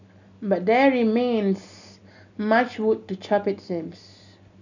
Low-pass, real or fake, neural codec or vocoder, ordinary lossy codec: 7.2 kHz; real; none; none